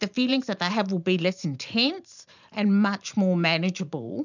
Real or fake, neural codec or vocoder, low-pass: fake; vocoder, 44.1 kHz, 128 mel bands every 512 samples, BigVGAN v2; 7.2 kHz